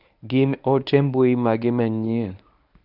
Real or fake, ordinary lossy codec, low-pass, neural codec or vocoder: fake; none; 5.4 kHz; codec, 24 kHz, 0.9 kbps, WavTokenizer, medium speech release version 1